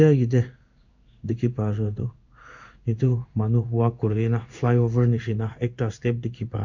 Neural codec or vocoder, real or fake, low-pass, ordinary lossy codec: codec, 16 kHz in and 24 kHz out, 1 kbps, XY-Tokenizer; fake; 7.2 kHz; MP3, 64 kbps